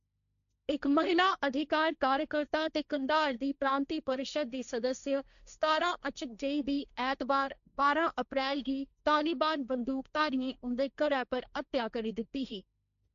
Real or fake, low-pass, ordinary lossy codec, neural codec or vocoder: fake; 7.2 kHz; none; codec, 16 kHz, 1.1 kbps, Voila-Tokenizer